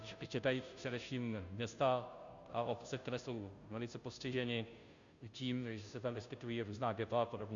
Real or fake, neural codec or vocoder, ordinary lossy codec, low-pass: fake; codec, 16 kHz, 0.5 kbps, FunCodec, trained on Chinese and English, 25 frames a second; Opus, 64 kbps; 7.2 kHz